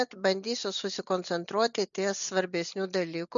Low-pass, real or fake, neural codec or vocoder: 7.2 kHz; real; none